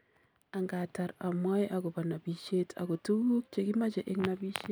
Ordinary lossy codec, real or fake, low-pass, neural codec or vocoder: none; real; none; none